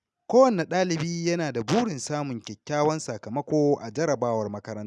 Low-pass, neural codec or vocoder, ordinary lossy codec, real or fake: none; none; none; real